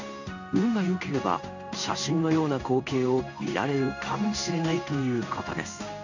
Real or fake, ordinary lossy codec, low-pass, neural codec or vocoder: fake; none; 7.2 kHz; codec, 16 kHz in and 24 kHz out, 1 kbps, XY-Tokenizer